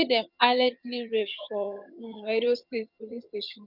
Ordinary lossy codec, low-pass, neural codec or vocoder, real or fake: none; 5.4 kHz; vocoder, 22.05 kHz, 80 mel bands, HiFi-GAN; fake